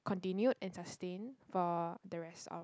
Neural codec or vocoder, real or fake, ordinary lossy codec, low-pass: none; real; none; none